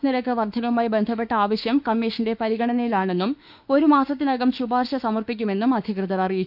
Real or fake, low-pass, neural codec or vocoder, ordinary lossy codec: fake; 5.4 kHz; autoencoder, 48 kHz, 32 numbers a frame, DAC-VAE, trained on Japanese speech; none